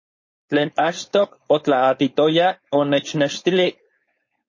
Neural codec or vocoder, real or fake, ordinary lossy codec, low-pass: codec, 16 kHz, 4.8 kbps, FACodec; fake; MP3, 32 kbps; 7.2 kHz